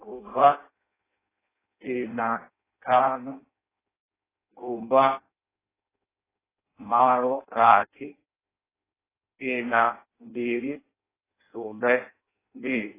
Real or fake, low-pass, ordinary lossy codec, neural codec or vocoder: fake; 3.6 kHz; AAC, 16 kbps; codec, 16 kHz in and 24 kHz out, 0.6 kbps, FireRedTTS-2 codec